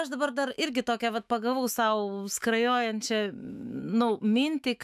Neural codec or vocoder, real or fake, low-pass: autoencoder, 48 kHz, 128 numbers a frame, DAC-VAE, trained on Japanese speech; fake; 14.4 kHz